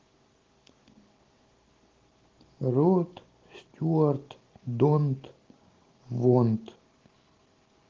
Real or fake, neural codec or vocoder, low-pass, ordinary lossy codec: real; none; 7.2 kHz; Opus, 16 kbps